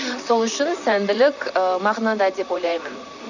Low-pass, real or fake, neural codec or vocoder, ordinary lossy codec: 7.2 kHz; fake; vocoder, 44.1 kHz, 128 mel bands, Pupu-Vocoder; none